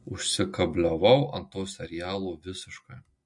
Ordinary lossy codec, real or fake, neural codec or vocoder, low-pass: MP3, 48 kbps; real; none; 10.8 kHz